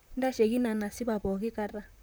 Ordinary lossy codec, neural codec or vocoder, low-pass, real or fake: none; vocoder, 44.1 kHz, 128 mel bands, Pupu-Vocoder; none; fake